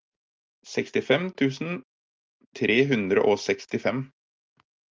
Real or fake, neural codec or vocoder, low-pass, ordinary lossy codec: real; none; 7.2 kHz; Opus, 24 kbps